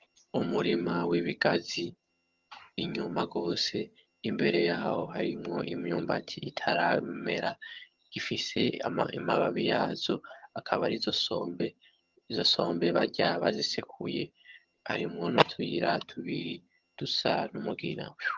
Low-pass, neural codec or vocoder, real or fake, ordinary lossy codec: 7.2 kHz; vocoder, 22.05 kHz, 80 mel bands, HiFi-GAN; fake; Opus, 32 kbps